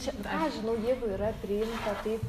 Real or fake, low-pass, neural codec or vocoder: real; 14.4 kHz; none